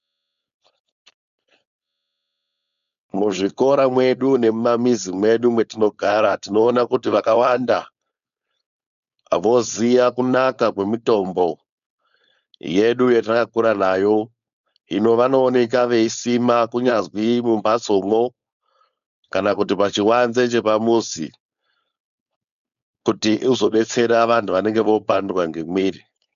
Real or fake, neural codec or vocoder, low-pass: fake; codec, 16 kHz, 4.8 kbps, FACodec; 7.2 kHz